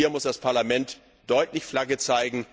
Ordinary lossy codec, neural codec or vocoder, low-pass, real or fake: none; none; none; real